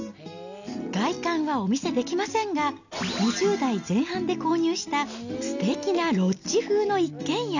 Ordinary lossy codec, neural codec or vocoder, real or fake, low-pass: none; none; real; 7.2 kHz